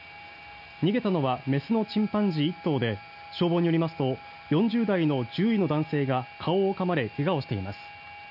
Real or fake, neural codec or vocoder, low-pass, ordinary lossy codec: real; none; 5.4 kHz; none